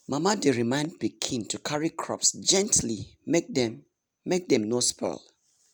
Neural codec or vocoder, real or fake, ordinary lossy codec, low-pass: none; real; none; none